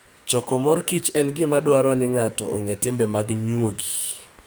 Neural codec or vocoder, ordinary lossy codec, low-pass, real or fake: codec, 44.1 kHz, 2.6 kbps, SNAC; none; none; fake